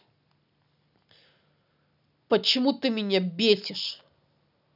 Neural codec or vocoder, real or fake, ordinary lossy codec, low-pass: none; real; none; 5.4 kHz